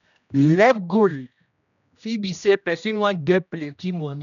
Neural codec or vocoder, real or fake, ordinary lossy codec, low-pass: codec, 16 kHz, 1 kbps, X-Codec, HuBERT features, trained on general audio; fake; none; 7.2 kHz